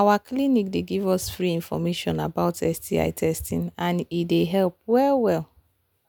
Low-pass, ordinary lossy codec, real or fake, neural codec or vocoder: none; none; real; none